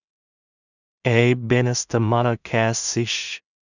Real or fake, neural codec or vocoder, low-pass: fake; codec, 16 kHz in and 24 kHz out, 0.4 kbps, LongCat-Audio-Codec, two codebook decoder; 7.2 kHz